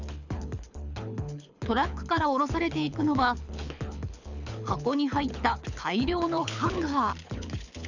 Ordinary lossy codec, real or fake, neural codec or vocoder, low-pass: none; fake; codec, 24 kHz, 6 kbps, HILCodec; 7.2 kHz